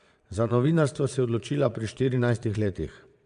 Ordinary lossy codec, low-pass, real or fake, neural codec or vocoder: none; 9.9 kHz; fake; vocoder, 22.05 kHz, 80 mel bands, Vocos